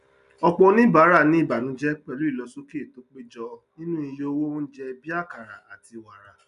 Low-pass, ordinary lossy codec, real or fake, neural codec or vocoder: 10.8 kHz; none; real; none